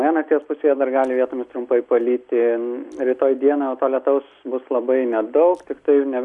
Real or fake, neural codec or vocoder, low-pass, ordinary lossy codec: real; none; 10.8 kHz; Opus, 64 kbps